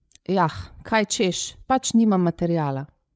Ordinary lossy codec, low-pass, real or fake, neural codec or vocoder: none; none; fake; codec, 16 kHz, 8 kbps, FreqCodec, larger model